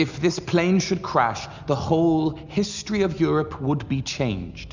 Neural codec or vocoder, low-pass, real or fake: none; 7.2 kHz; real